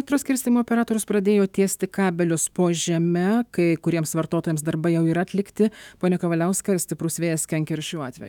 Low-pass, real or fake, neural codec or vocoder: 19.8 kHz; fake; autoencoder, 48 kHz, 128 numbers a frame, DAC-VAE, trained on Japanese speech